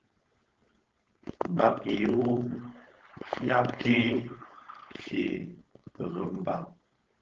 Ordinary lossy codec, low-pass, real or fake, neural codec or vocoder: Opus, 16 kbps; 7.2 kHz; fake; codec, 16 kHz, 4.8 kbps, FACodec